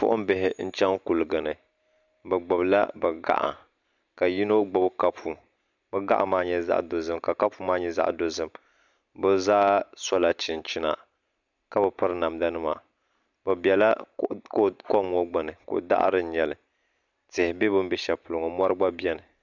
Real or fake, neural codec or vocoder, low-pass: real; none; 7.2 kHz